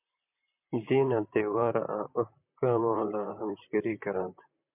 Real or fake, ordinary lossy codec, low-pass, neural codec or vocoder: fake; MP3, 32 kbps; 3.6 kHz; vocoder, 44.1 kHz, 128 mel bands, Pupu-Vocoder